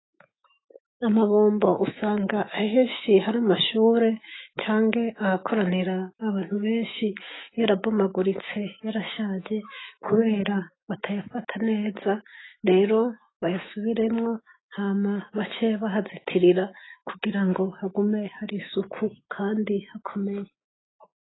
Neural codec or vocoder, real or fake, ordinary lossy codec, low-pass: autoencoder, 48 kHz, 128 numbers a frame, DAC-VAE, trained on Japanese speech; fake; AAC, 16 kbps; 7.2 kHz